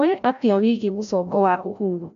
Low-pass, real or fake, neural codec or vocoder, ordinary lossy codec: 7.2 kHz; fake; codec, 16 kHz, 0.5 kbps, FreqCodec, larger model; none